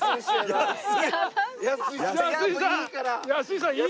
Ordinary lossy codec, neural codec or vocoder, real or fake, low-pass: none; none; real; none